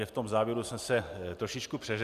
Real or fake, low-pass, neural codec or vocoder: real; 14.4 kHz; none